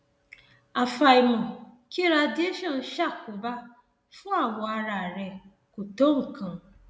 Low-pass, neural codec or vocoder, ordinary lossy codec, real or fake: none; none; none; real